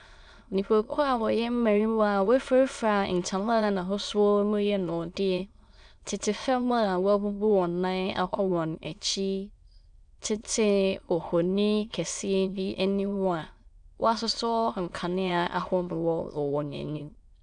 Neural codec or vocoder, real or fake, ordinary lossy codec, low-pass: autoencoder, 22.05 kHz, a latent of 192 numbers a frame, VITS, trained on many speakers; fake; MP3, 96 kbps; 9.9 kHz